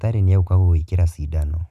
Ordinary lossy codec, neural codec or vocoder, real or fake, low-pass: none; none; real; 14.4 kHz